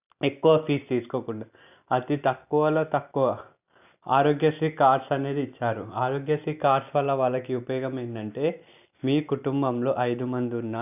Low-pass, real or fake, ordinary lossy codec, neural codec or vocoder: 3.6 kHz; real; none; none